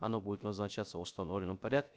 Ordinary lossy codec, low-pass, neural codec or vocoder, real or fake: none; none; codec, 16 kHz, 0.3 kbps, FocalCodec; fake